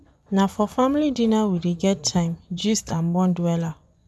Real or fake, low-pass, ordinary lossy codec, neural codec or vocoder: fake; none; none; vocoder, 24 kHz, 100 mel bands, Vocos